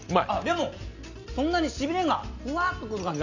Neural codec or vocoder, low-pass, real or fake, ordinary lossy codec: none; 7.2 kHz; real; none